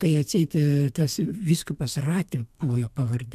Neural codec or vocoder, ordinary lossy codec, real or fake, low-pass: codec, 32 kHz, 1.9 kbps, SNAC; MP3, 96 kbps; fake; 14.4 kHz